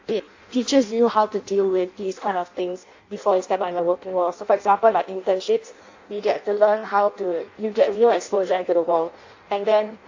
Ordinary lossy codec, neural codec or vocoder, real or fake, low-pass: AAC, 48 kbps; codec, 16 kHz in and 24 kHz out, 0.6 kbps, FireRedTTS-2 codec; fake; 7.2 kHz